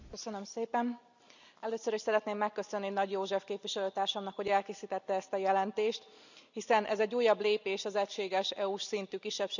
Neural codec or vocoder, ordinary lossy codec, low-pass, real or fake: none; none; 7.2 kHz; real